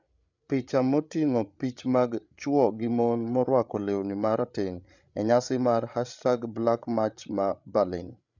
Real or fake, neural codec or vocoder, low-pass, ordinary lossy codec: fake; codec, 16 kHz, 8 kbps, FreqCodec, larger model; 7.2 kHz; none